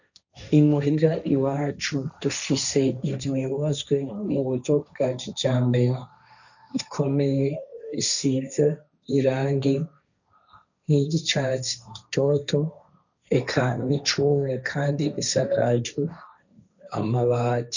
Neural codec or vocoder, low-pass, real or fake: codec, 16 kHz, 1.1 kbps, Voila-Tokenizer; 7.2 kHz; fake